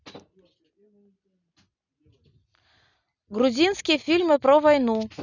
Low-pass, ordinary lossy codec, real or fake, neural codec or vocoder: 7.2 kHz; none; real; none